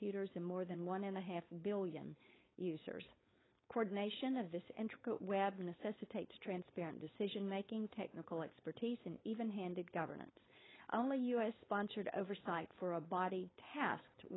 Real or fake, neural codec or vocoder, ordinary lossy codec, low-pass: fake; codec, 16 kHz, 4.8 kbps, FACodec; AAC, 16 kbps; 7.2 kHz